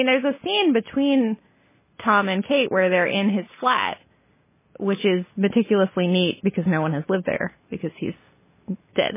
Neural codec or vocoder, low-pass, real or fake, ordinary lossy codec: none; 3.6 kHz; real; MP3, 16 kbps